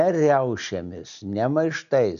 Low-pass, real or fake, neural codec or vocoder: 7.2 kHz; real; none